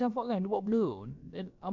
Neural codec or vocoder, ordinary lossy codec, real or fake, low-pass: codec, 16 kHz, about 1 kbps, DyCAST, with the encoder's durations; none; fake; 7.2 kHz